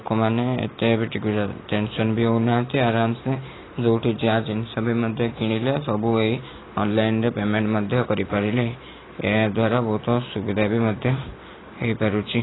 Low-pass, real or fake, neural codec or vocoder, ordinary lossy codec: 7.2 kHz; real; none; AAC, 16 kbps